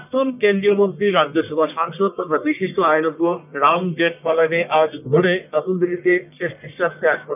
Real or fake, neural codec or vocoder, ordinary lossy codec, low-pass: fake; codec, 44.1 kHz, 1.7 kbps, Pupu-Codec; none; 3.6 kHz